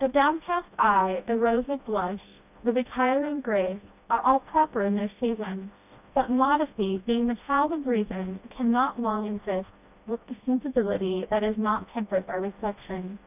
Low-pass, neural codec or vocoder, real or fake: 3.6 kHz; codec, 16 kHz, 1 kbps, FreqCodec, smaller model; fake